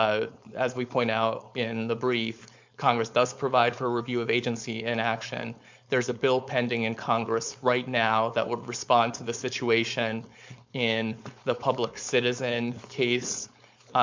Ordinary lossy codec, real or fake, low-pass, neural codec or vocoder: MP3, 64 kbps; fake; 7.2 kHz; codec, 16 kHz, 4.8 kbps, FACodec